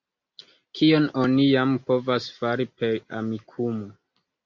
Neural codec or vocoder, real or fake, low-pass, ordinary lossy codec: none; real; 7.2 kHz; MP3, 48 kbps